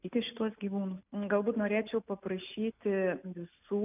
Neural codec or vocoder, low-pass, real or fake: none; 3.6 kHz; real